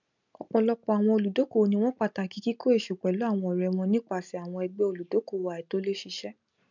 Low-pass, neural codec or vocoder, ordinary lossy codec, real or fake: 7.2 kHz; none; none; real